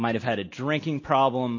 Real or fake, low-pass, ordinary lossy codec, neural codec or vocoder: real; 7.2 kHz; MP3, 32 kbps; none